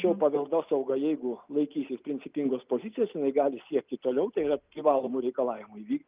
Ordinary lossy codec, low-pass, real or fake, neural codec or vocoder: Opus, 32 kbps; 3.6 kHz; real; none